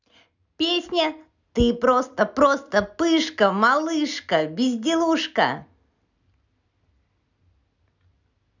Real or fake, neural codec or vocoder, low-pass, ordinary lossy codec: real; none; 7.2 kHz; none